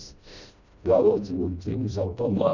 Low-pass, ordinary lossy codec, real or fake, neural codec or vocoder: 7.2 kHz; none; fake; codec, 16 kHz, 1 kbps, FreqCodec, smaller model